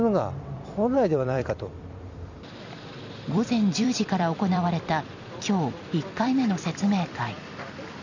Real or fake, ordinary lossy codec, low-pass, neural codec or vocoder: fake; none; 7.2 kHz; vocoder, 44.1 kHz, 128 mel bands every 256 samples, BigVGAN v2